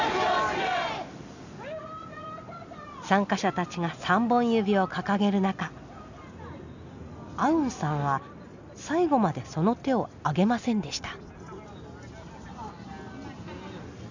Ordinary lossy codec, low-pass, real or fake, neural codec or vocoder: none; 7.2 kHz; real; none